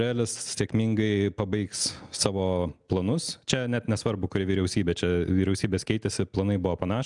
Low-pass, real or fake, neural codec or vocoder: 10.8 kHz; real; none